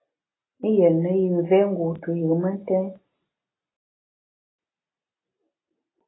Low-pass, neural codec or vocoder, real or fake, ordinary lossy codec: 7.2 kHz; none; real; AAC, 16 kbps